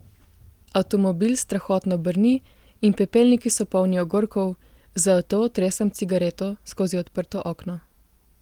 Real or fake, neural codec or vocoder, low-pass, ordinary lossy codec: real; none; 19.8 kHz; Opus, 24 kbps